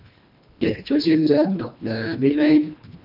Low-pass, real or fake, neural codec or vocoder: 5.4 kHz; fake; codec, 24 kHz, 1.5 kbps, HILCodec